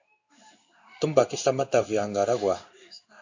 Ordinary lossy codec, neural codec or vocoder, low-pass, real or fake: AAC, 48 kbps; codec, 16 kHz in and 24 kHz out, 1 kbps, XY-Tokenizer; 7.2 kHz; fake